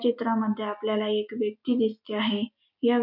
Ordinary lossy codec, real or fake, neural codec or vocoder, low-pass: none; real; none; 5.4 kHz